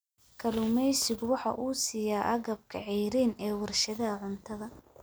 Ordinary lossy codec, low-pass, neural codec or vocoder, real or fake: none; none; none; real